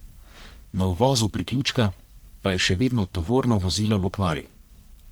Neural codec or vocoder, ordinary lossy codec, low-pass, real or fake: codec, 44.1 kHz, 1.7 kbps, Pupu-Codec; none; none; fake